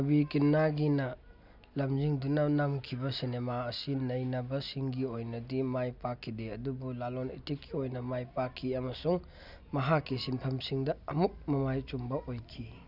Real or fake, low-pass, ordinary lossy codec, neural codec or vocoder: real; 5.4 kHz; none; none